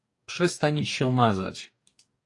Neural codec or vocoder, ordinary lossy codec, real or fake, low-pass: codec, 44.1 kHz, 2.6 kbps, DAC; AAC, 48 kbps; fake; 10.8 kHz